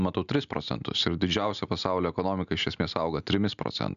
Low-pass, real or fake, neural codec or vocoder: 7.2 kHz; real; none